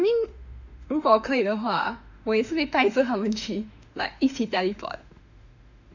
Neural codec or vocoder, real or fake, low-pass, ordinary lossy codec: autoencoder, 48 kHz, 32 numbers a frame, DAC-VAE, trained on Japanese speech; fake; 7.2 kHz; none